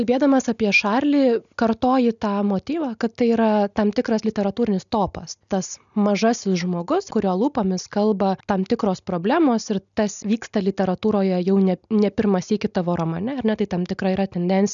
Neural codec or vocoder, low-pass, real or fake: none; 7.2 kHz; real